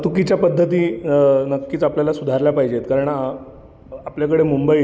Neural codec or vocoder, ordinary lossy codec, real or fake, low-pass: none; none; real; none